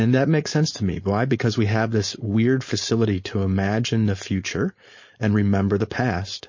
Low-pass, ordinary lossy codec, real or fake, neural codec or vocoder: 7.2 kHz; MP3, 32 kbps; fake; codec, 16 kHz, 4.8 kbps, FACodec